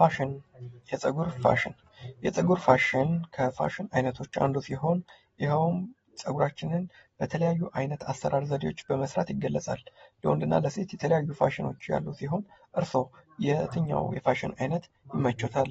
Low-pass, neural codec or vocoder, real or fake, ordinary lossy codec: 19.8 kHz; none; real; AAC, 24 kbps